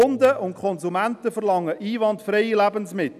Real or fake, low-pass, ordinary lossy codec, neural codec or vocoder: real; 14.4 kHz; none; none